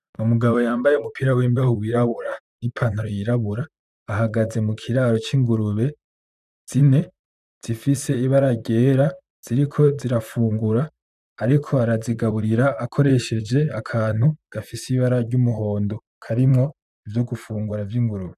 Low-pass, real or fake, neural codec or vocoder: 14.4 kHz; fake; vocoder, 44.1 kHz, 128 mel bands, Pupu-Vocoder